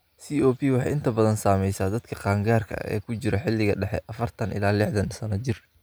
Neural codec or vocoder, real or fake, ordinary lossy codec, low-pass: none; real; none; none